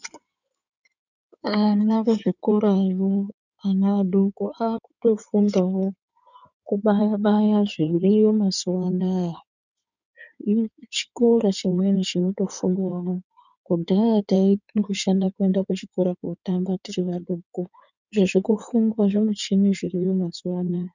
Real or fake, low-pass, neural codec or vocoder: fake; 7.2 kHz; codec, 16 kHz in and 24 kHz out, 2.2 kbps, FireRedTTS-2 codec